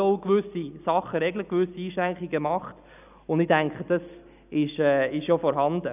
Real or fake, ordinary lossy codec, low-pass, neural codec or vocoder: real; none; 3.6 kHz; none